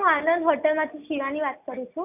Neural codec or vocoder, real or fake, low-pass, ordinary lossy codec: none; real; 3.6 kHz; AAC, 32 kbps